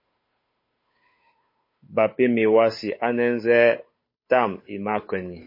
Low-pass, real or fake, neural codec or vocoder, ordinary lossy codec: 5.4 kHz; fake; codec, 16 kHz, 8 kbps, FunCodec, trained on Chinese and English, 25 frames a second; MP3, 24 kbps